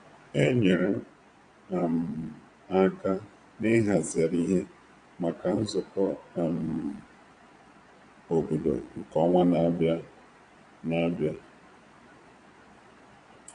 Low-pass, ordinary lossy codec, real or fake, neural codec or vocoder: 9.9 kHz; AAC, 96 kbps; fake; vocoder, 22.05 kHz, 80 mel bands, Vocos